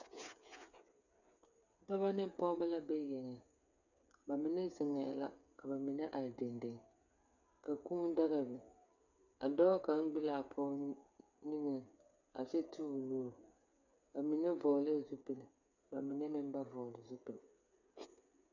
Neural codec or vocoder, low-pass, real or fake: codec, 16 kHz, 8 kbps, FreqCodec, smaller model; 7.2 kHz; fake